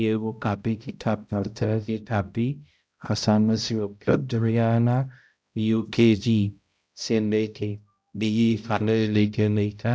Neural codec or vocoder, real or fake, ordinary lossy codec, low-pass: codec, 16 kHz, 0.5 kbps, X-Codec, HuBERT features, trained on balanced general audio; fake; none; none